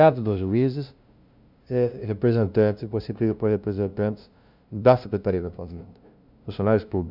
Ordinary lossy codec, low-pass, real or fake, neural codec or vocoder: none; 5.4 kHz; fake; codec, 16 kHz, 0.5 kbps, FunCodec, trained on LibriTTS, 25 frames a second